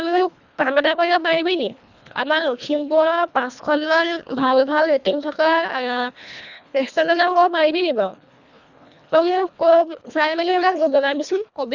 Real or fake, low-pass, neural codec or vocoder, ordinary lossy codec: fake; 7.2 kHz; codec, 24 kHz, 1.5 kbps, HILCodec; none